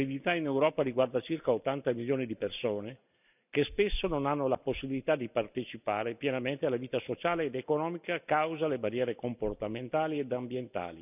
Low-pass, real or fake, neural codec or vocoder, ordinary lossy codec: 3.6 kHz; real; none; none